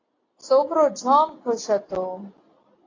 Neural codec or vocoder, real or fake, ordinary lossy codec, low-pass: none; real; AAC, 32 kbps; 7.2 kHz